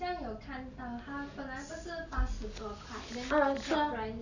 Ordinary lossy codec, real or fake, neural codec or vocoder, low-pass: none; real; none; 7.2 kHz